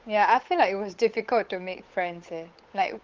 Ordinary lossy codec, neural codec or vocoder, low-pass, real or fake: Opus, 24 kbps; codec, 16 kHz, 8 kbps, FunCodec, trained on Chinese and English, 25 frames a second; 7.2 kHz; fake